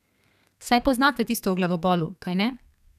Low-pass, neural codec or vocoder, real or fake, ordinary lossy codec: 14.4 kHz; codec, 32 kHz, 1.9 kbps, SNAC; fake; none